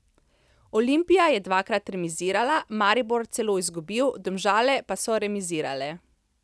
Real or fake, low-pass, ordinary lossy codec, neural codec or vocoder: real; none; none; none